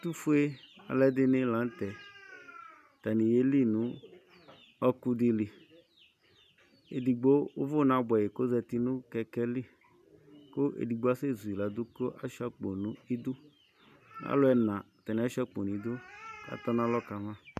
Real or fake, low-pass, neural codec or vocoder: real; 14.4 kHz; none